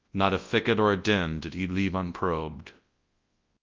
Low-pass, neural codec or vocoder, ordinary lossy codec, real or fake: 7.2 kHz; codec, 24 kHz, 0.9 kbps, WavTokenizer, large speech release; Opus, 32 kbps; fake